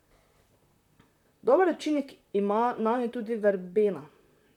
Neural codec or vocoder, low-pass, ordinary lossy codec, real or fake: vocoder, 44.1 kHz, 128 mel bands, Pupu-Vocoder; 19.8 kHz; none; fake